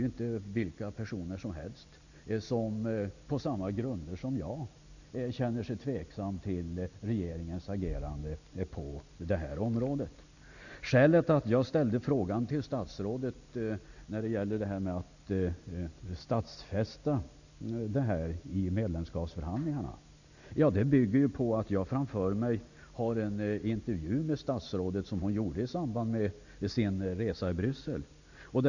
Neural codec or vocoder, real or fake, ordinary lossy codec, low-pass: none; real; none; 7.2 kHz